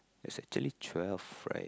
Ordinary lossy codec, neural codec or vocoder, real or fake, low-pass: none; none; real; none